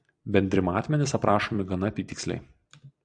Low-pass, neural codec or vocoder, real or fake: 9.9 kHz; vocoder, 44.1 kHz, 128 mel bands every 256 samples, BigVGAN v2; fake